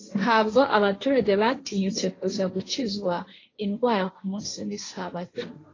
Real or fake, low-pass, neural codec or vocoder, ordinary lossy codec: fake; 7.2 kHz; codec, 16 kHz, 1.1 kbps, Voila-Tokenizer; AAC, 32 kbps